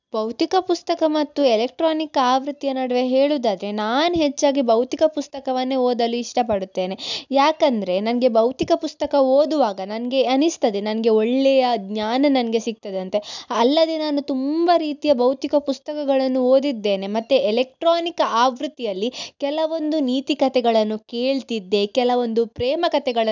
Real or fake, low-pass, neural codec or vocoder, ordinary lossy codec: real; 7.2 kHz; none; none